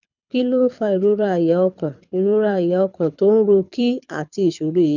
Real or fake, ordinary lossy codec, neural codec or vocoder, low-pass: fake; none; codec, 24 kHz, 6 kbps, HILCodec; 7.2 kHz